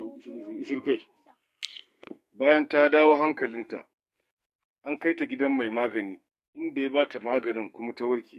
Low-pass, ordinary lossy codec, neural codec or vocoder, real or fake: 14.4 kHz; MP3, 64 kbps; codec, 44.1 kHz, 2.6 kbps, SNAC; fake